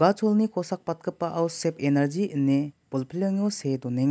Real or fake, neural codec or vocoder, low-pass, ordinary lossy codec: real; none; none; none